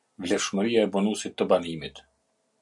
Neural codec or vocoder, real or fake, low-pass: none; real; 10.8 kHz